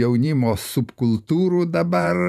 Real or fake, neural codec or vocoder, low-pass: fake; vocoder, 44.1 kHz, 128 mel bands every 512 samples, BigVGAN v2; 14.4 kHz